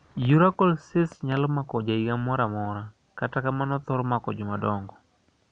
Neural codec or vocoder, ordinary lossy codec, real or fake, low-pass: none; none; real; 9.9 kHz